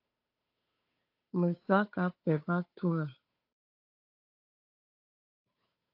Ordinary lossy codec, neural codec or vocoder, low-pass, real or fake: AAC, 32 kbps; codec, 16 kHz, 8 kbps, FunCodec, trained on Chinese and English, 25 frames a second; 5.4 kHz; fake